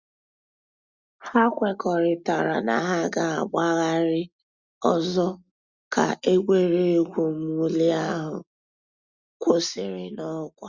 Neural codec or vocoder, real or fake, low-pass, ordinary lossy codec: none; real; 7.2 kHz; Opus, 64 kbps